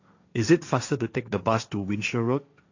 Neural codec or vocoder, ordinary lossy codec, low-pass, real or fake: codec, 16 kHz, 1.1 kbps, Voila-Tokenizer; AAC, 48 kbps; 7.2 kHz; fake